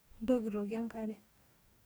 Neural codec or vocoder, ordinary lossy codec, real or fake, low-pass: codec, 44.1 kHz, 2.6 kbps, DAC; none; fake; none